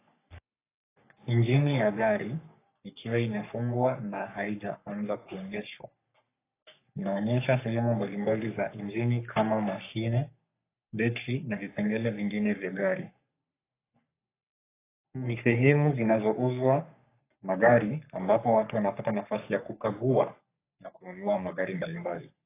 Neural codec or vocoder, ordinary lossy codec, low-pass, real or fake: codec, 44.1 kHz, 3.4 kbps, Pupu-Codec; AAC, 24 kbps; 3.6 kHz; fake